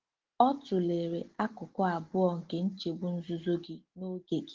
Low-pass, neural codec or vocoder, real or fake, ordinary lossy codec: 7.2 kHz; none; real; Opus, 16 kbps